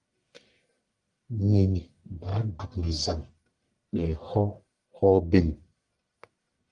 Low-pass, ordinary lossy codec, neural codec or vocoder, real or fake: 10.8 kHz; Opus, 32 kbps; codec, 44.1 kHz, 1.7 kbps, Pupu-Codec; fake